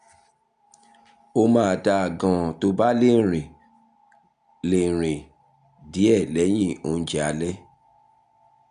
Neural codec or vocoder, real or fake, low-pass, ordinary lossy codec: none; real; 9.9 kHz; AAC, 96 kbps